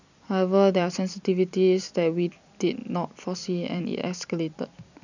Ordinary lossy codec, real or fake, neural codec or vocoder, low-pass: none; fake; vocoder, 44.1 kHz, 128 mel bands every 256 samples, BigVGAN v2; 7.2 kHz